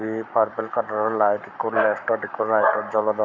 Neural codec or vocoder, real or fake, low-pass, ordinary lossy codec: codec, 44.1 kHz, 7.8 kbps, Pupu-Codec; fake; 7.2 kHz; none